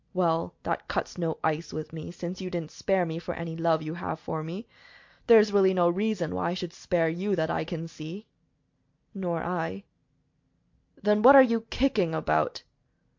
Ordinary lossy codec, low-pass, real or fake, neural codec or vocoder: MP3, 48 kbps; 7.2 kHz; real; none